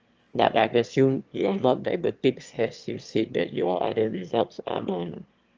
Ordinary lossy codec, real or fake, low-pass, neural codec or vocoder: Opus, 24 kbps; fake; 7.2 kHz; autoencoder, 22.05 kHz, a latent of 192 numbers a frame, VITS, trained on one speaker